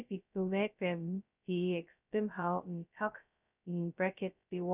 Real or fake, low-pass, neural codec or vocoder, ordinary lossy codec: fake; 3.6 kHz; codec, 16 kHz, 0.2 kbps, FocalCodec; none